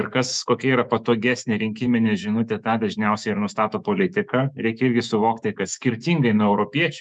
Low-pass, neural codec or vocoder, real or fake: 9.9 kHz; autoencoder, 48 kHz, 128 numbers a frame, DAC-VAE, trained on Japanese speech; fake